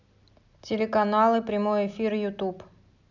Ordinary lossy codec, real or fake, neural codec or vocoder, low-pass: none; real; none; 7.2 kHz